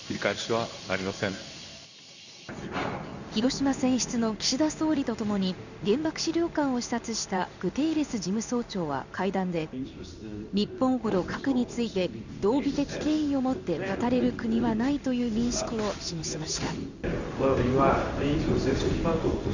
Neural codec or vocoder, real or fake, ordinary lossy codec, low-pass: codec, 16 kHz in and 24 kHz out, 1 kbps, XY-Tokenizer; fake; none; 7.2 kHz